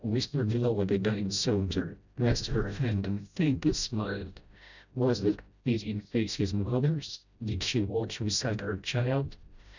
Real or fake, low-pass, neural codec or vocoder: fake; 7.2 kHz; codec, 16 kHz, 0.5 kbps, FreqCodec, smaller model